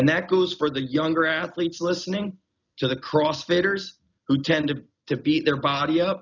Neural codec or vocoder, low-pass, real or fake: none; 7.2 kHz; real